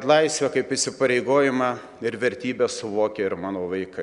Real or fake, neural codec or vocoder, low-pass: real; none; 10.8 kHz